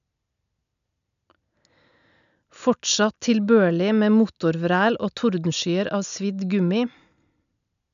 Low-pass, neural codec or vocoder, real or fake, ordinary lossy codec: 7.2 kHz; none; real; none